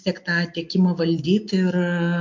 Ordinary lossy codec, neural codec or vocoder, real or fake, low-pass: MP3, 48 kbps; none; real; 7.2 kHz